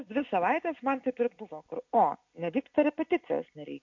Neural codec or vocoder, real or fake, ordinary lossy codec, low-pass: none; real; MP3, 48 kbps; 7.2 kHz